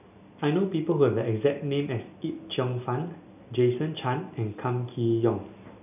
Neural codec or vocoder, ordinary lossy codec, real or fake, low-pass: none; none; real; 3.6 kHz